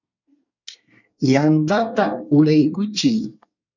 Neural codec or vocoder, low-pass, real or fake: codec, 24 kHz, 1 kbps, SNAC; 7.2 kHz; fake